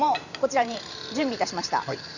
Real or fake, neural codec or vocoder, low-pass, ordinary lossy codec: fake; vocoder, 44.1 kHz, 128 mel bands every 512 samples, BigVGAN v2; 7.2 kHz; none